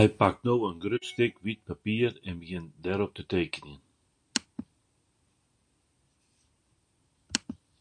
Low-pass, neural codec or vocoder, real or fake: 9.9 kHz; none; real